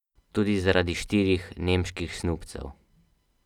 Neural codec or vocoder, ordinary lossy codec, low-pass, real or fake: none; none; 19.8 kHz; real